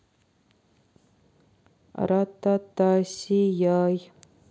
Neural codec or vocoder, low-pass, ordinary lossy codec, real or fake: none; none; none; real